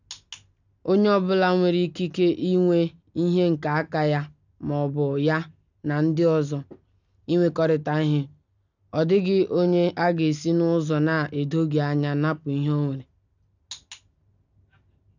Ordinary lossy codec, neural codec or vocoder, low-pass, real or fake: none; none; 7.2 kHz; real